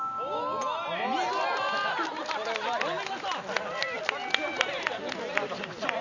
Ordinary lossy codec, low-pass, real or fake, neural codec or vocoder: none; 7.2 kHz; real; none